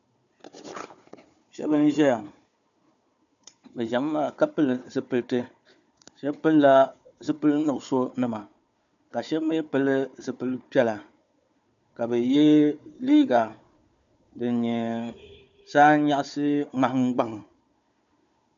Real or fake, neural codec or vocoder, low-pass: fake; codec, 16 kHz, 4 kbps, FunCodec, trained on Chinese and English, 50 frames a second; 7.2 kHz